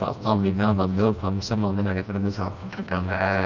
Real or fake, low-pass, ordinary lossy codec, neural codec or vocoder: fake; 7.2 kHz; none; codec, 16 kHz, 1 kbps, FreqCodec, smaller model